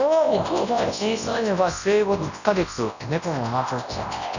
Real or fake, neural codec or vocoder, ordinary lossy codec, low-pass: fake; codec, 24 kHz, 0.9 kbps, WavTokenizer, large speech release; none; 7.2 kHz